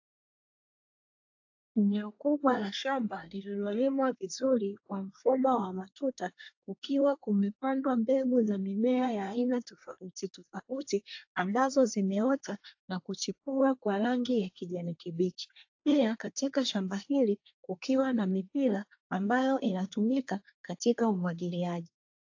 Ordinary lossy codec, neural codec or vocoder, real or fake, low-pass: AAC, 48 kbps; codec, 24 kHz, 1 kbps, SNAC; fake; 7.2 kHz